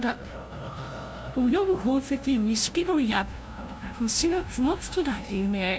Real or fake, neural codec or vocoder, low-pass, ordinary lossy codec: fake; codec, 16 kHz, 0.5 kbps, FunCodec, trained on LibriTTS, 25 frames a second; none; none